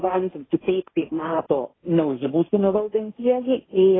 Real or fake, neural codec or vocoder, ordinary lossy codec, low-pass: fake; codec, 16 kHz, 1.1 kbps, Voila-Tokenizer; AAC, 16 kbps; 7.2 kHz